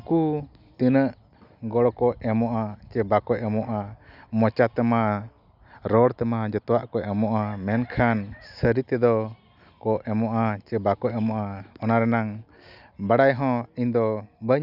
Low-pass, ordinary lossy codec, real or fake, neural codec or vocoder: 5.4 kHz; none; real; none